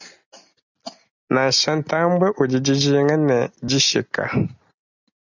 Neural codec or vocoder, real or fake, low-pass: none; real; 7.2 kHz